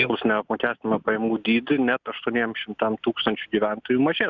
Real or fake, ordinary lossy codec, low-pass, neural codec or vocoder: real; Opus, 64 kbps; 7.2 kHz; none